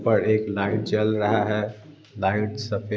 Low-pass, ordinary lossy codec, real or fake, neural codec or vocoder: none; none; real; none